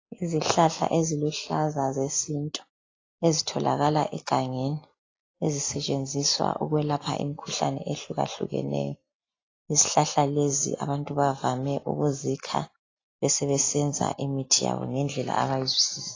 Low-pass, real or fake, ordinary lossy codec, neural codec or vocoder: 7.2 kHz; real; AAC, 32 kbps; none